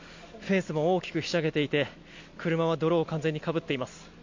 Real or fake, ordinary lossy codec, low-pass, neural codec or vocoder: real; MP3, 48 kbps; 7.2 kHz; none